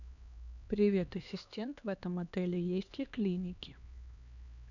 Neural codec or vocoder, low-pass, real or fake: codec, 16 kHz, 2 kbps, X-Codec, HuBERT features, trained on LibriSpeech; 7.2 kHz; fake